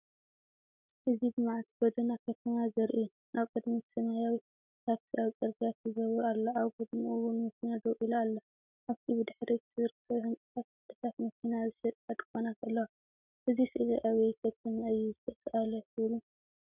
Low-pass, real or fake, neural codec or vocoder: 3.6 kHz; real; none